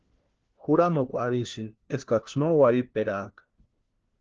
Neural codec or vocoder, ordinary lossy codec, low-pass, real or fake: codec, 16 kHz, 1 kbps, FunCodec, trained on LibriTTS, 50 frames a second; Opus, 16 kbps; 7.2 kHz; fake